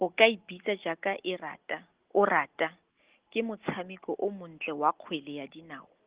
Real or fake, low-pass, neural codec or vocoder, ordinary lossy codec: real; 3.6 kHz; none; Opus, 16 kbps